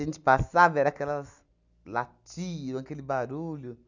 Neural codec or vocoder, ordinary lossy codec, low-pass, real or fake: none; none; 7.2 kHz; real